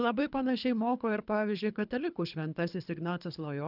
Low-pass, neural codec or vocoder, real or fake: 5.4 kHz; codec, 24 kHz, 3 kbps, HILCodec; fake